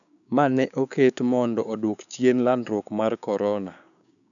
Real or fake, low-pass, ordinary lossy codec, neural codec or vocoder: fake; 7.2 kHz; none; codec, 16 kHz, 6 kbps, DAC